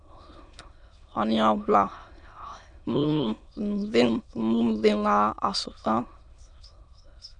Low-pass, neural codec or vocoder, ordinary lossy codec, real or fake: 9.9 kHz; autoencoder, 22.05 kHz, a latent of 192 numbers a frame, VITS, trained on many speakers; MP3, 96 kbps; fake